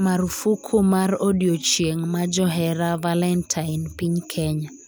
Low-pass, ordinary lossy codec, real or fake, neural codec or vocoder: none; none; real; none